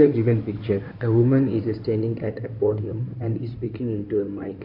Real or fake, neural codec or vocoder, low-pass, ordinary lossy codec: fake; codec, 16 kHz in and 24 kHz out, 2.2 kbps, FireRedTTS-2 codec; 5.4 kHz; none